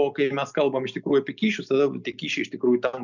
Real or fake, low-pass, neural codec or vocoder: real; 7.2 kHz; none